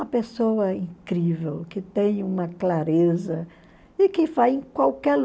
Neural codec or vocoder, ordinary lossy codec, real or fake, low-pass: none; none; real; none